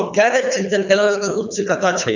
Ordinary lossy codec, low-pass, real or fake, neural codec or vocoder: none; 7.2 kHz; fake; codec, 24 kHz, 3 kbps, HILCodec